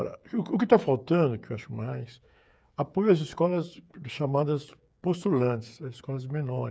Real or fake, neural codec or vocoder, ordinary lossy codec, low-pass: fake; codec, 16 kHz, 8 kbps, FreqCodec, smaller model; none; none